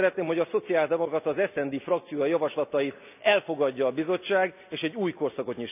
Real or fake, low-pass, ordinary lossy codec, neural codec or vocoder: real; 3.6 kHz; none; none